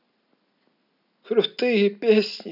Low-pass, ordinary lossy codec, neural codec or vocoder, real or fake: 5.4 kHz; none; none; real